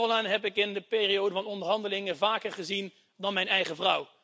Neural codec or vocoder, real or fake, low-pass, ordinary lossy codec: none; real; none; none